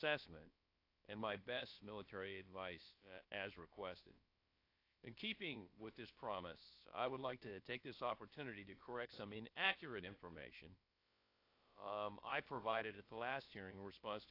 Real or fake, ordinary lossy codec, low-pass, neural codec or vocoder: fake; AAC, 32 kbps; 5.4 kHz; codec, 16 kHz, about 1 kbps, DyCAST, with the encoder's durations